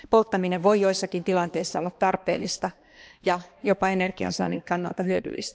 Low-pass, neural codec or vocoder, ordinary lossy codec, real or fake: none; codec, 16 kHz, 2 kbps, X-Codec, HuBERT features, trained on balanced general audio; none; fake